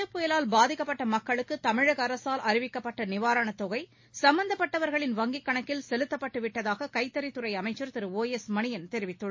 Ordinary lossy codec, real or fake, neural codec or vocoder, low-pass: MP3, 32 kbps; real; none; 7.2 kHz